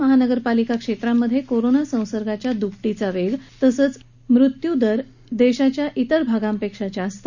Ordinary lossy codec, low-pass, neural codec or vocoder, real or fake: none; 7.2 kHz; none; real